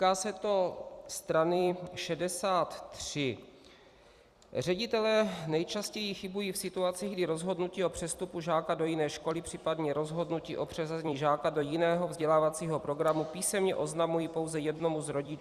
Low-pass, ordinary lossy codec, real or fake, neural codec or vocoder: 14.4 kHz; Opus, 64 kbps; fake; vocoder, 44.1 kHz, 128 mel bands every 256 samples, BigVGAN v2